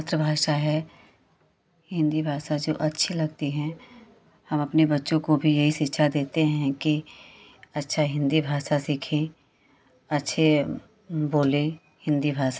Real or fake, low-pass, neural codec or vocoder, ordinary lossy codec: real; none; none; none